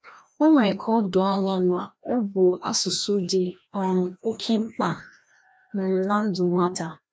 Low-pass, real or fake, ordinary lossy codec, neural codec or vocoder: none; fake; none; codec, 16 kHz, 1 kbps, FreqCodec, larger model